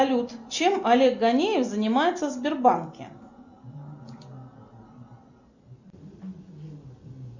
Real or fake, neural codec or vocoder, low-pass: real; none; 7.2 kHz